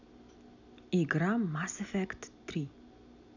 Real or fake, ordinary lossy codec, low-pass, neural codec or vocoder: real; none; 7.2 kHz; none